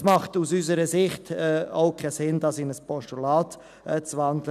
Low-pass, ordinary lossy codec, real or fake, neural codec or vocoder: 14.4 kHz; none; real; none